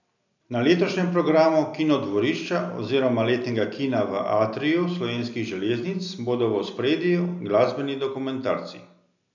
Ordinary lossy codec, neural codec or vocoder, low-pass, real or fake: none; none; 7.2 kHz; real